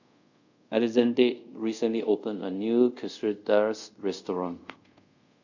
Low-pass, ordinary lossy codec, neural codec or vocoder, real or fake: 7.2 kHz; none; codec, 24 kHz, 0.5 kbps, DualCodec; fake